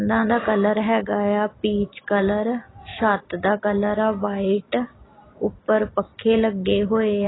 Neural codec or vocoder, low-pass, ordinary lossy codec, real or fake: none; 7.2 kHz; AAC, 16 kbps; real